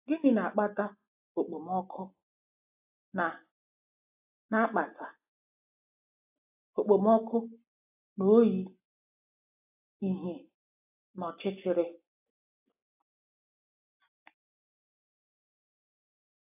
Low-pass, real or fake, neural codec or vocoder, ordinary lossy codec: 3.6 kHz; real; none; AAC, 24 kbps